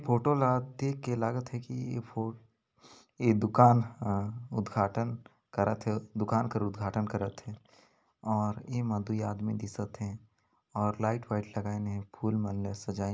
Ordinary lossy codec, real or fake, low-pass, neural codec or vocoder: none; real; none; none